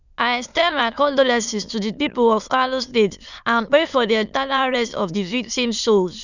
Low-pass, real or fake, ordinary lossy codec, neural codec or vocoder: 7.2 kHz; fake; none; autoencoder, 22.05 kHz, a latent of 192 numbers a frame, VITS, trained on many speakers